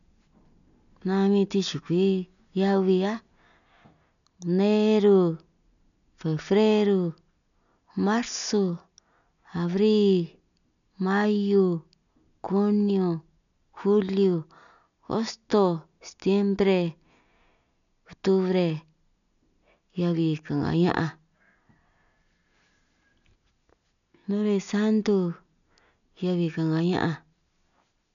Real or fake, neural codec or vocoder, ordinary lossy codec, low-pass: real; none; none; 7.2 kHz